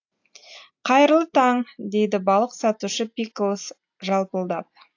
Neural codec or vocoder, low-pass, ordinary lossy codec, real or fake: none; 7.2 kHz; AAC, 48 kbps; real